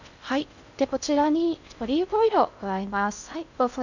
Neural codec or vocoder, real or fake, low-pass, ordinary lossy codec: codec, 16 kHz in and 24 kHz out, 0.6 kbps, FocalCodec, streaming, 2048 codes; fake; 7.2 kHz; none